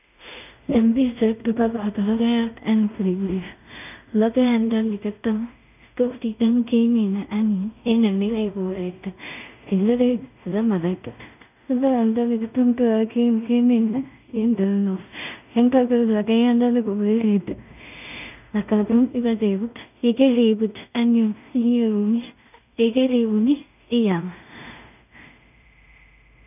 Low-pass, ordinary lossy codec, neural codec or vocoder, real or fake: 3.6 kHz; none; codec, 16 kHz in and 24 kHz out, 0.4 kbps, LongCat-Audio-Codec, two codebook decoder; fake